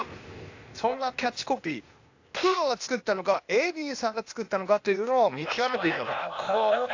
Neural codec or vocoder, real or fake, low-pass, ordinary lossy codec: codec, 16 kHz, 0.8 kbps, ZipCodec; fake; 7.2 kHz; AAC, 48 kbps